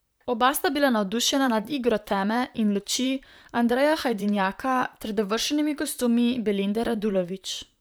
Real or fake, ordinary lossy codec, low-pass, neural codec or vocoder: fake; none; none; vocoder, 44.1 kHz, 128 mel bands, Pupu-Vocoder